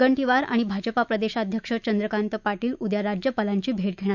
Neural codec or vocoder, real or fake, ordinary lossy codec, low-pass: autoencoder, 48 kHz, 128 numbers a frame, DAC-VAE, trained on Japanese speech; fake; none; 7.2 kHz